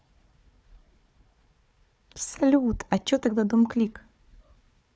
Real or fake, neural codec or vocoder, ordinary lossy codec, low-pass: fake; codec, 16 kHz, 4 kbps, FunCodec, trained on Chinese and English, 50 frames a second; none; none